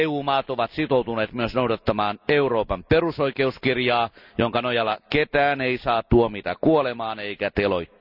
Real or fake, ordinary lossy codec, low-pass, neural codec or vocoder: real; none; 5.4 kHz; none